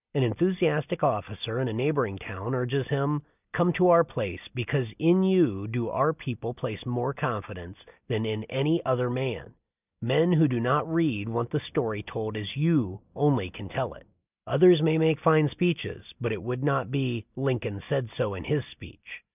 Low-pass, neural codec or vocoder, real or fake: 3.6 kHz; none; real